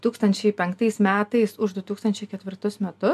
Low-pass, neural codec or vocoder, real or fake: 14.4 kHz; none; real